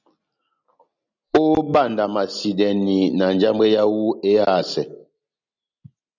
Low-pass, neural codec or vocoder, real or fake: 7.2 kHz; none; real